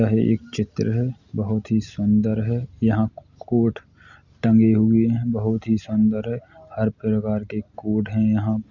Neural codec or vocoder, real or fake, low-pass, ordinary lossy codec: none; real; 7.2 kHz; Opus, 64 kbps